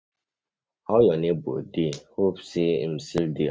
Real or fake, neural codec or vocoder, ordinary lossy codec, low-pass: real; none; none; none